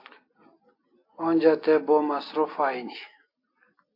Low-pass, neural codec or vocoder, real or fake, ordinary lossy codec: 5.4 kHz; none; real; AAC, 32 kbps